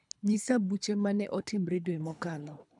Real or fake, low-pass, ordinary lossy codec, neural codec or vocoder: fake; 10.8 kHz; none; codec, 24 kHz, 3 kbps, HILCodec